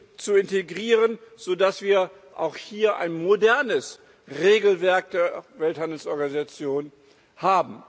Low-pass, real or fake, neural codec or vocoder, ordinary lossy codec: none; real; none; none